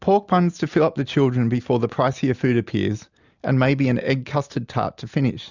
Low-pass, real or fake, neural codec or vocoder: 7.2 kHz; real; none